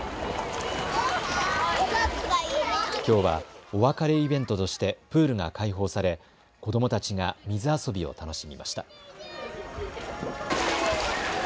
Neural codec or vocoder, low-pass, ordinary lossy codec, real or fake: none; none; none; real